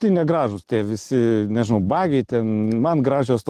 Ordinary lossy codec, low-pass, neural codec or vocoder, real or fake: Opus, 16 kbps; 14.4 kHz; none; real